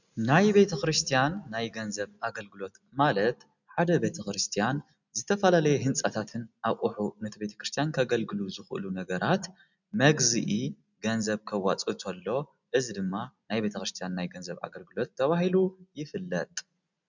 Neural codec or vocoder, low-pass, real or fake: none; 7.2 kHz; real